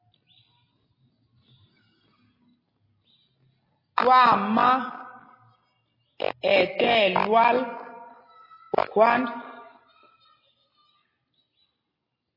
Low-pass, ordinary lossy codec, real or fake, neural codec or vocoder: 5.4 kHz; MP3, 24 kbps; real; none